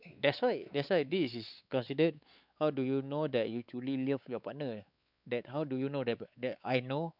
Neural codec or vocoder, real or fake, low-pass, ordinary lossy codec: codec, 16 kHz, 4 kbps, X-Codec, WavLM features, trained on Multilingual LibriSpeech; fake; 5.4 kHz; none